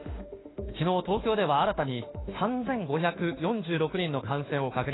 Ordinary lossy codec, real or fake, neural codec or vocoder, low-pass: AAC, 16 kbps; fake; autoencoder, 48 kHz, 32 numbers a frame, DAC-VAE, trained on Japanese speech; 7.2 kHz